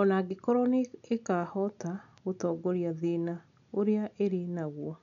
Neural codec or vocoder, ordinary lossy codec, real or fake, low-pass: none; none; real; 7.2 kHz